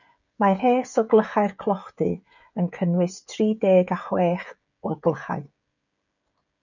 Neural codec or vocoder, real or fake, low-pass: codec, 16 kHz, 16 kbps, FreqCodec, smaller model; fake; 7.2 kHz